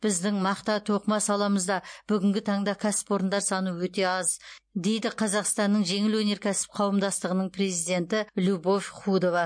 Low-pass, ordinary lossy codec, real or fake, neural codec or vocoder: 9.9 kHz; MP3, 48 kbps; real; none